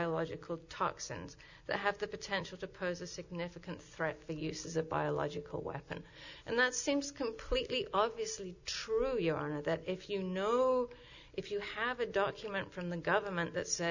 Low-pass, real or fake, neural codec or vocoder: 7.2 kHz; real; none